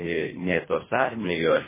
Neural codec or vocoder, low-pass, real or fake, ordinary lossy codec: codec, 24 kHz, 1.5 kbps, HILCodec; 3.6 kHz; fake; MP3, 16 kbps